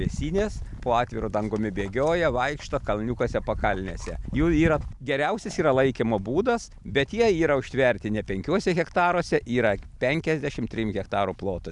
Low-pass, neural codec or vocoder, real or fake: 10.8 kHz; none; real